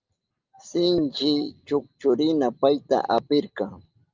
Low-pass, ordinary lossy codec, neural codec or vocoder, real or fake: 7.2 kHz; Opus, 24 kbps; none; real